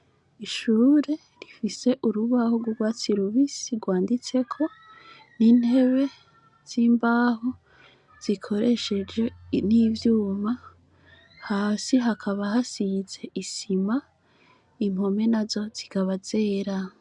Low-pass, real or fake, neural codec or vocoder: 10.8 kHz; real; none